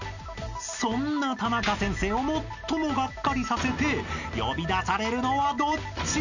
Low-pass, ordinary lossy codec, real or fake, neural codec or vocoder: 7.2 kHz; none; real; none